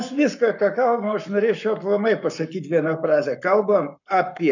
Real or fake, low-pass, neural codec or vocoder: fake; 7.2 kHz; codec, 44.1 kHz, 7.8 kbps, Pupu-Codec